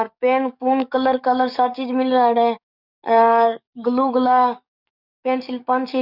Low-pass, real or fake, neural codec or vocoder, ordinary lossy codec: 5.4 kHz; fake; codec, 44.1 kHz, 7.8 kbps, DAC; none